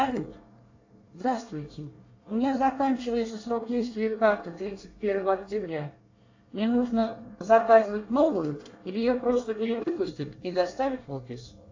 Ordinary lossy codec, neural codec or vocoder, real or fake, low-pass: AAC, 48 kbps; codec, 24 kHz, 1 kbps, SNAC; fake; 7.2 kHz